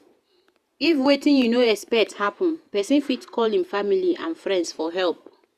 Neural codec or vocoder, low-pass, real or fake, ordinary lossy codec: vocoder, 48 kHz, 128 mel bands, Vocos; 14.4 kHz; fake; Opus, 64 kbps